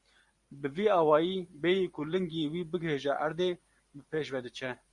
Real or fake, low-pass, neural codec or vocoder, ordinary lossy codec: fake; 10.8 kHz; vocoder, 44.1 kHz, 128 mel bands every 512 samples, BigVGAN v2; Opus, 64 kbps